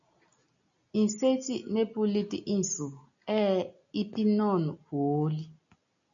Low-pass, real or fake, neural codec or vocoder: 7.2 kHz; real; none